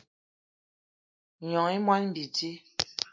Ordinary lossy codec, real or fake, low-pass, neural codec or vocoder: MP3, 64 kbps; real; 7.2 kHz; none